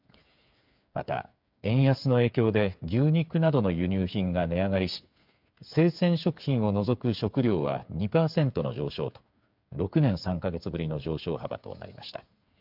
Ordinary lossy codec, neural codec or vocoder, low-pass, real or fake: MP3, 48 kbps; codec, 16 kHz, 8 kbps, FreqCodec, smaller model; 5.4 kHz; fake